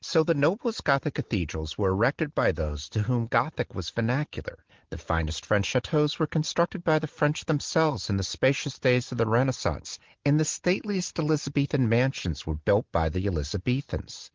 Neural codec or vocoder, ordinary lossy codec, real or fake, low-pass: none; Opus, 16 kbps; real; 7.2 kHz